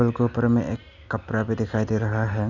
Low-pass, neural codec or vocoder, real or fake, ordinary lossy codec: 7.2 kHz; none; real; none